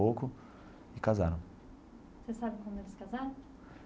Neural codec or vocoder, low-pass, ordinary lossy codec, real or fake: none; none; none; real